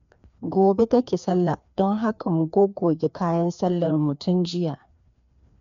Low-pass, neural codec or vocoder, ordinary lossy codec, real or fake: 7.2 kHz; codec, 16 kHz, 2 kbps, FreqCodec, larger model; MP3, 64 kbps; fake